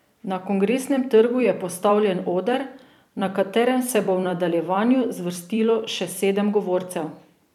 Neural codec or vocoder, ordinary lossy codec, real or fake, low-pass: vocoder, 44.1 kHz, 128 mel bands every 256 samples, BigVGAN v2; none; fake; 19.8 kHz